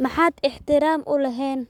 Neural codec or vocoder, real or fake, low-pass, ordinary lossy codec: codec, 44.1 kHz, 7.8 kbps, Pupu-Codec; fake; 19.8 kHz; none